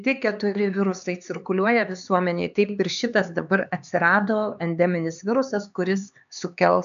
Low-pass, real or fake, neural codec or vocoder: 7.2 kHz; fake; codec, 16 kHz, 4 kbps, X-Codec, HuBERT features, trained on LibriSpeech